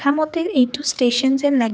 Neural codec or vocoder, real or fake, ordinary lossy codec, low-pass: codec, 16 kHz, 4 kbps, X-Codec, HuBERT features, trained on general audio; fake; none; none